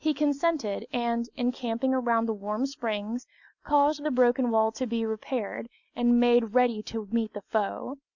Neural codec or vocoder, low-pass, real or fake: none; 7.2 kHz; real